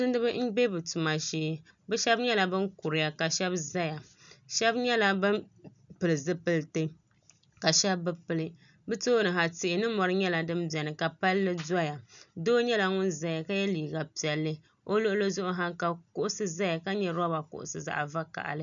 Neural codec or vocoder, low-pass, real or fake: none; 7.2 kHz; real